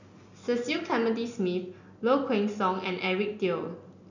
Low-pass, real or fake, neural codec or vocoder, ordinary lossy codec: 7.2 kHz; real; none; none